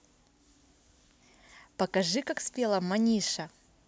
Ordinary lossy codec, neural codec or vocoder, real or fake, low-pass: none; none; real; none